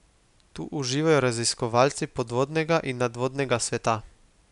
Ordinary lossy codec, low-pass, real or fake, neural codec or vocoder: none; 10.8 kHz; real; none